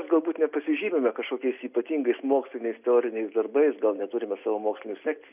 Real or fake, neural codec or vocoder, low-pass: real; none; 3.6 kHz